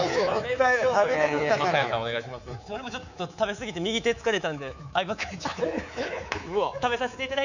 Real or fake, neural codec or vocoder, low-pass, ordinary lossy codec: fake; codec, 24 kHz, 3.1 kbps, DualCodec; 7.2 kHz; none